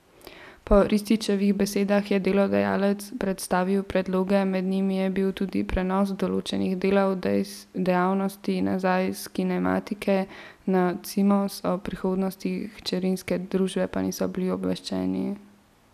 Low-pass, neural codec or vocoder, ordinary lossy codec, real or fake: 14.4 kHz; none; none; real